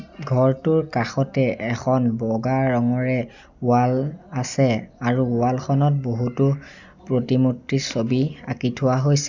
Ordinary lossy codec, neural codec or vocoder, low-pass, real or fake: none; none; 7.2 kHz; real